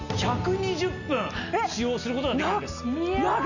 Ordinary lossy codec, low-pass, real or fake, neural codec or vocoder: none; 7.2 kHz; real; none